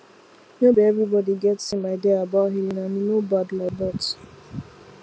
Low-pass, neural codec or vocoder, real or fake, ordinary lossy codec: none; none; real; none